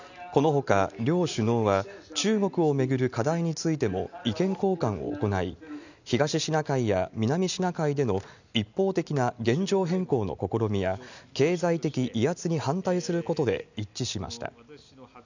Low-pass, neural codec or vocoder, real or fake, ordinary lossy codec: 7.2 kHz; none; real; none